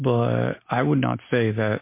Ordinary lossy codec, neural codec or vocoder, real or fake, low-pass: MP3, 24 kbps; none; real; 3.6 kHz